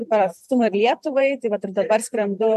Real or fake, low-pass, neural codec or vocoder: fake; 14.4 kHz; vocoder, 44.1 kHz, 128 mel bands every 512 samples, BigVGAN v2